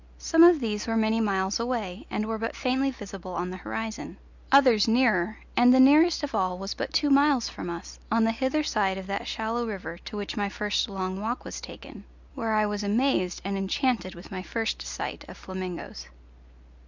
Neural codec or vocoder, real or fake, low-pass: none; real; 7.2 kHz